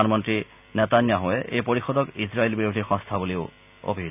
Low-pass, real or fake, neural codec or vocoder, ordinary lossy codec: 3.6 kHz; real; none; none